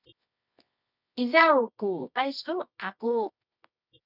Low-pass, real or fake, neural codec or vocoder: 5.4 kHz; fake; codec, 24 kHz, 0.9 kbps, WavTokenizer, medium music audio release